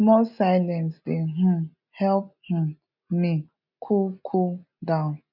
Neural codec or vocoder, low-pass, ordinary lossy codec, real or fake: none; 5.4 kHz; none; real